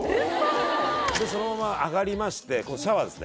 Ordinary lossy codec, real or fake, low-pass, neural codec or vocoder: none; real; none; none